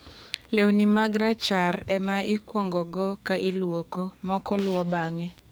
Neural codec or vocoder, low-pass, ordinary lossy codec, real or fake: codec, 44.1 kHz, 2.6 kbps, SNAC; none; none; fake